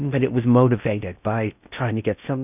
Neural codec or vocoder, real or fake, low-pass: codec, 16 kHz in and 24 kHz out, 0.6 kbps, FocalCodec, streaming, 4096 codes; fake; 3.6 kHz